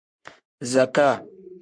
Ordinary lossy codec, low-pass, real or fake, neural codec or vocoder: MP3, 64 kbps; 9.9 kHz; fake; codec, 44.1 kHz, 3.4 kbps, Pupu-Codec